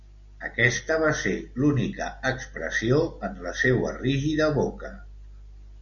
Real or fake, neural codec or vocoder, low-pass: real; none; 7.2 kHz